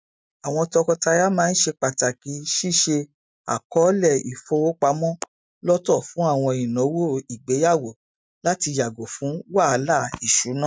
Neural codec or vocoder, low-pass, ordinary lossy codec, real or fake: none; none; none; real